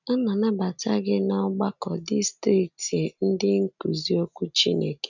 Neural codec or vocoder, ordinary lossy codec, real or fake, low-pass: none; none; real; 7.2 kHz